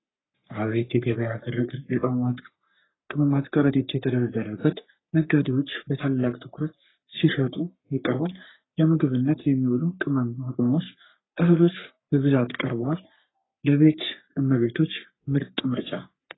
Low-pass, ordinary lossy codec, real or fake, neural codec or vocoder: 7.2 kHz; AAC, 16 kbps; fake; codec, 44.1 kHz, 3.4 kbps, Pupu-Codec